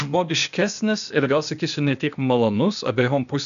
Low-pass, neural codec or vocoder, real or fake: 7.2 kHz; codec, 16 kHz, 0.8 kbps, ZipCodec; fake